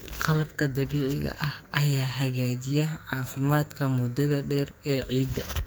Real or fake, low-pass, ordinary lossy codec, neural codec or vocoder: fake; none; none; codec, 44.1 kHz, 2.6 kbps, SNAC